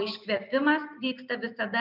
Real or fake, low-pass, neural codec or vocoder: real; 5.4 kHz; none